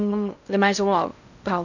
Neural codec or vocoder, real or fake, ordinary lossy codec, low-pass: codec, 16 kHz in and 24 kHz out, 0.6 kbps, FocalCodec, streaming, 2048 codes; fake; none; 7.2 kHz